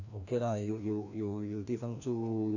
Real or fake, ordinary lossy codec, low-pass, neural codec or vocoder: fake; none; 7.2 kHz; codec, 16 kHz, 1 kbps, FreqCodec, larger model